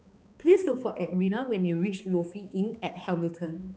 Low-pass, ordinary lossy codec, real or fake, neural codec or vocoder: none; none; fake; codec, 16 kHz, 2 kbps, X-Codec, HuBERT features, trained on balanced general audio